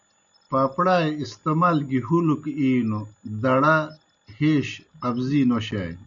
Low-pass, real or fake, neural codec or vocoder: 7.2 kHz; real; none